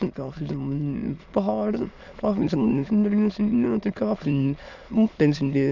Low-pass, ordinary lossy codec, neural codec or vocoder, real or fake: 7.2 kHz; none; autoencoder, 22.05 kHz, a latent of 192 numbers a frame, VITS, trained on many speakers; fake